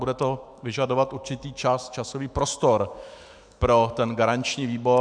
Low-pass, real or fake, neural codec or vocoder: 9.9 kHz; fake; autoencoder, 48 kHz, 128 numbers a frame, DAC-VAE, trained on Japanese speech